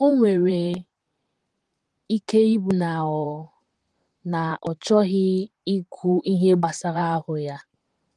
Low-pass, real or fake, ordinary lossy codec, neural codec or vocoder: 10.8 kHz; fake; Opus, 24 kbps; vocoder, 44.1 kHz, 128 mel bands, Pupu-Vocoder